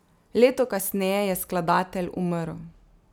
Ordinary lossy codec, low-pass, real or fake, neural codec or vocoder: none; none; real; none